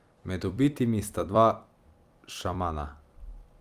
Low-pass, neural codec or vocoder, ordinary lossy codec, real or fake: 14.4 kHz; vocoder, 44.1 kHz, 128 mel bands every 256 samples, BigVGAN v2; Opus, 32 kbps; fake